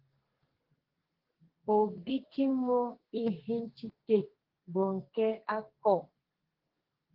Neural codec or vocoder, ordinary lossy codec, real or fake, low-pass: codec, 32 kHz, 1.9 kbps, SNAC; Opus, 16 kbps; fake; 5.4 kHz